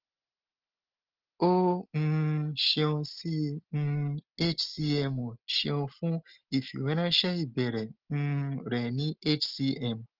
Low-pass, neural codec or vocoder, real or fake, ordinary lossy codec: 5.4 kHz; none; real; Opus, 16 kbps